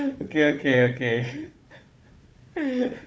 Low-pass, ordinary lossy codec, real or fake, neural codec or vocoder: none; none; fake; codec, 16 kHz, 4 kbps, FunCodec, trained on Chinese and English, 50 frames a second